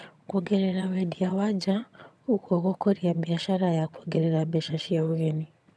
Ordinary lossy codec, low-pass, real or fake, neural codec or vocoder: none; none; fake; vocoder, 22.05 kHz, 80 mel bands, HiFi-GAN